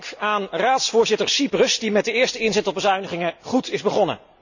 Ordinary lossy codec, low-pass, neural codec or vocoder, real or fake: none; 7.2 kHz; none; real